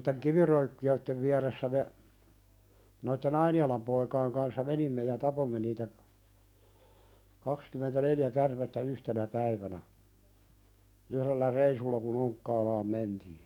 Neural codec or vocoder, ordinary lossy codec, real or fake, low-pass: codec, 44.1 kHz, 7.8 kbps, DAC; none; fake; 19.8 kHz